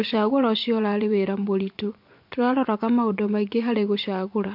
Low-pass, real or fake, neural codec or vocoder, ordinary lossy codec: 5.4 kHz; fake; vocoder, 24 kHz, 100 mel bands, Vocos; MP3, 48 kbps